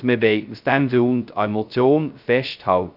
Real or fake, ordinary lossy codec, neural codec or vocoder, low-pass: fake; none; codec, 16 kHz, 0.2 kbps, FocalCodec; 5.4 kHz